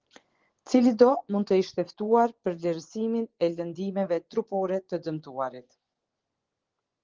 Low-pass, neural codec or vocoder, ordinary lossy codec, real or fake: 7.2 kHz; vocoder, 24 kHz, 100 mel bands, Vocos; Opus, 16 kbps; fake